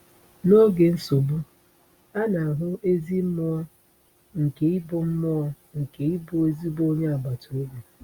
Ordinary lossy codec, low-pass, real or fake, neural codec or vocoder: Opus, 64 kbps; 19.8 kHz; real; none